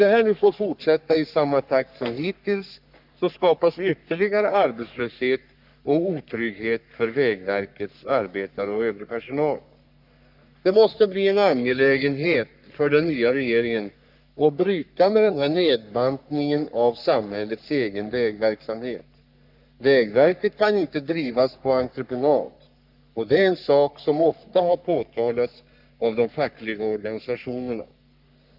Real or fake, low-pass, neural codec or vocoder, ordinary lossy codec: fake; 5.4 kHz; codec, 44.1 kHz, 3.4 kbps, Pupu-Codec; none